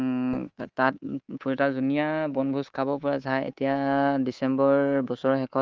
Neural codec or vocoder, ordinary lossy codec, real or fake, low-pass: codec, 24 kHz, 3.1 kbps, DualCodec; Opus, 24 kbps; fake; 7.2 kHz